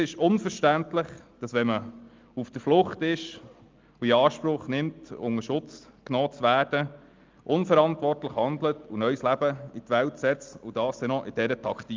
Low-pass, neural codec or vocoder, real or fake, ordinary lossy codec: 7.2 kHz; none; real; Opus, 32 kbps